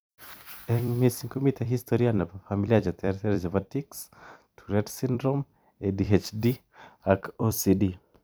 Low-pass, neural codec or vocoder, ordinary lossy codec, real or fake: none; vocoder, 44.1 kHz, 128 mel bands every 256 samples, BigVGAN v2; none; fake